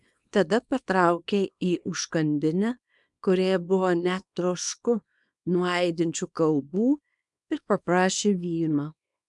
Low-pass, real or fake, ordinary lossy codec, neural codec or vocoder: 10.8 kHz; fake; MP3, 96 kbps; codec, 24 kHz, 0.9 kbps, WavTokenizer, small release